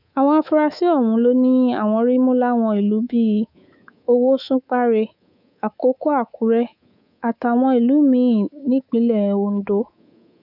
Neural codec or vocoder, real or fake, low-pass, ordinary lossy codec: codec, 24 kHz, 3.1 kbps, DualCodec; fake; 5.4 kHz; none